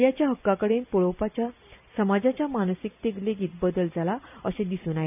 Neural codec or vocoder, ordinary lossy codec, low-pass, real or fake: none; none; 3.6 kHz; real